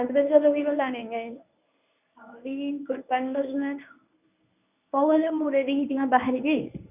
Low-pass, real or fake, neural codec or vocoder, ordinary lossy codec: 3.6 kHz; fake; codec, 24 kHz, 0.9 kbps, WavTokenizer, medium speech release version 1; none